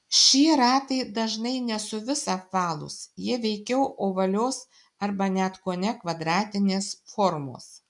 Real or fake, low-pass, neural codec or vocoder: real; 10.8 kHz; none